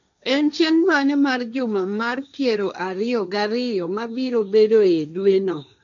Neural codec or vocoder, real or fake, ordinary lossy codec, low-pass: codec, 16 kHz, 1.1 kbps, Voila-Tokenizer; fake; none; 7.2 kHz